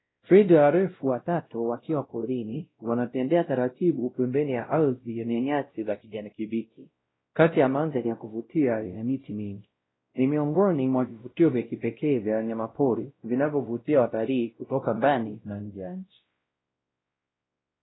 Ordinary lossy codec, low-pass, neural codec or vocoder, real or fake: AAC, 16 kbps; 7.2 kHz; codec, 16 kHz, 0.5 kbps, X-Codec, WavLM features, trained on Multilingual LibriSpeech; fake